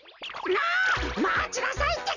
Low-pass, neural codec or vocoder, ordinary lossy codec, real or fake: 7.2 kHz; none; none; real